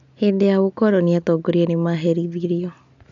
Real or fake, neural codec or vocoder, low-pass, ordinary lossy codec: real; none; 7.2 kHz; AAC, 64 kbps